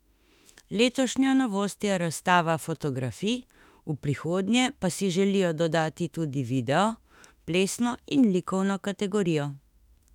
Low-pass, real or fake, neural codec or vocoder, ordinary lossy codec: 19.8 kHz; fake; autoencoder, 48 kHz, 32 numbers a frame, DAC-VAE, trained on Japanese speech; none